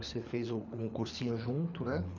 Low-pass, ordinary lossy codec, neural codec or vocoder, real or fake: 7.2 kHz; none; codec, 24 kHz, 3 kbps, HILCodec; fake